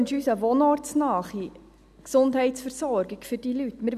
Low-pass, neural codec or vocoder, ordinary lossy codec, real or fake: 14.4 kHz; none; none; real